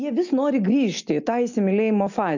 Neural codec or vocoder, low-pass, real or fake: none; 7.2 kHz; real